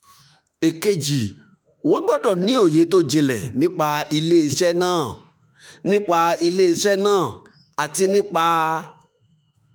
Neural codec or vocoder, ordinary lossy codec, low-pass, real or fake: autoencoder, 48 kHz, 32 numbers a frame, DAC-VAE, trained on Japanese speech; none; none; fake